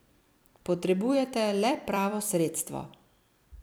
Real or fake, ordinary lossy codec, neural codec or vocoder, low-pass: fake; none; vocoder, 44.1 kHz, 128 mel bands every 256 samples, BigVGAN v2; none